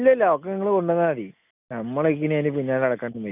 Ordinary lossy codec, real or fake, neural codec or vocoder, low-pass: none; real; none; 3.6 kHz